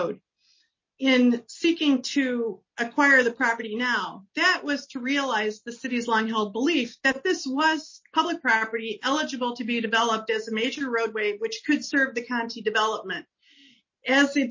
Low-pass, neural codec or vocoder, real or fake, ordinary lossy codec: 7.2 kHz; none; real; MP3, 32 kbps